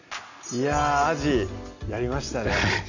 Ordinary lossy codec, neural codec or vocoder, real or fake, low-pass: none; none; real; 7.2 kHz